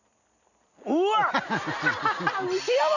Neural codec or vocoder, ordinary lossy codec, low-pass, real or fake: none; none; 7.2 kHz; real